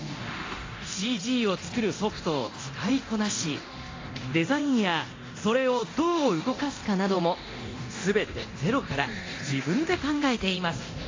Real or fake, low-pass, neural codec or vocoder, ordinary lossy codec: fake; 7.2 kHz; codec, 24 kHz, 0.9 kbps, DualCodec; AAC, 32 kbps